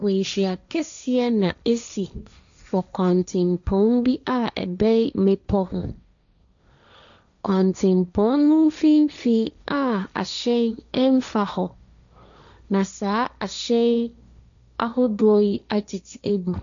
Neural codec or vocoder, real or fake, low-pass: codec, 16 kHz, 1.1 kbps, Voila-Tokenizer; fake; 7.2 kHz